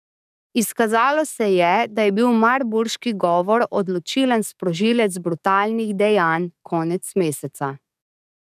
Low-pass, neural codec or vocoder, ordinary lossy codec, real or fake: 14.4 kHz; codec, 44.1 kHz, 7.8 kbps, DAC; none; fake